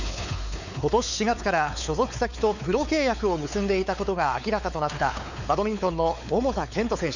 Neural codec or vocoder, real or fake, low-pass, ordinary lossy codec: codec, 16 kHz, 4 kbps, X-Codec, WavLM features, trained on Multilingual LibriSpeech; fake; 7.2 kHz; none